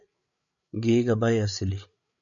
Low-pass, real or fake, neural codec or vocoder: 7.2 kHz; fake; codec, 16 kHz, 8 kbps, FreqCodec, larger model